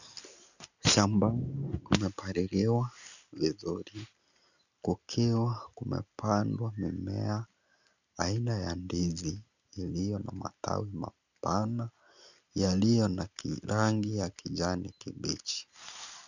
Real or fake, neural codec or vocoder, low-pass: real; none; 7.2 kHz